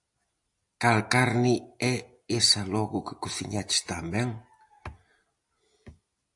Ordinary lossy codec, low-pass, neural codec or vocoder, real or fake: AAC, 64 kbps; 10.8 kHz; none; real